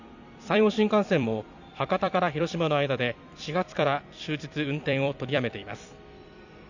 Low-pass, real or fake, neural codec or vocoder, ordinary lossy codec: 7.2 kHz; fake; vocoder, 44.1 kHz, 128 mel bands every 256 samples, BigVGAN v2; none